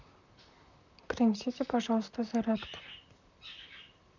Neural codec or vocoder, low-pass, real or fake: vocoder, 44.1 kHz, 128 mel bands, Pupu-Vocoder; 7.2 kHz; fake